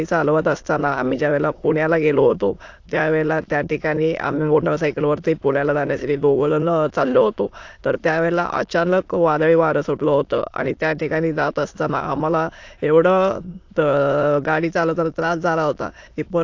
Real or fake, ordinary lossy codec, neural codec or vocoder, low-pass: fake; AAC, 48 kbps; autoencoder, 22.05 kHz, a latent of 192 numbers a frame, VITS, trained on many speakers; 7.2 kHz